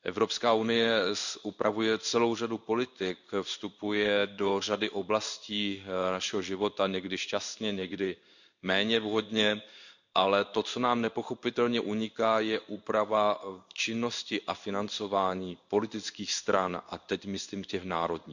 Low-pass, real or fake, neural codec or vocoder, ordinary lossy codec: 7.2 kHz; fake; codec, 16 kHz in and 24 kHz out, 1 kbps, XY-Tokenizer; none